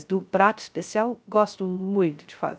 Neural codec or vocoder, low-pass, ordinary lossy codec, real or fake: codec, 16 kHz, 0.3 kbps, FocalCodec; none; none; fake